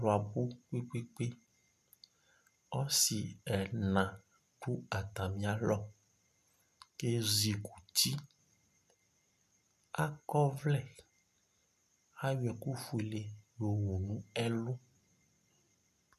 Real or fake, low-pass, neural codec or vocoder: real; 14.4 kHz; none